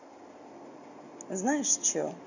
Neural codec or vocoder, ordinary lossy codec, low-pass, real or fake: none; none; 7.2 kHz; real